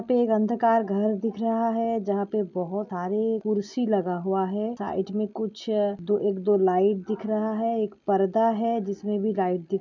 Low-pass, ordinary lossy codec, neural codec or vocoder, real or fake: 7.2 kHz; none; none; real